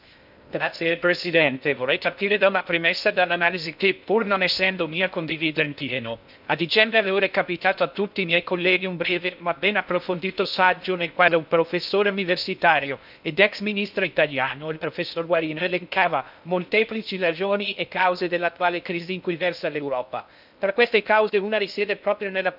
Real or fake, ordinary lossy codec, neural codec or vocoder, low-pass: fake; none; codec, 16 kHz in and 24 kHz out, 0.6 kbps, FocalCodec, streaming, 2048 codes; 5.4 kHz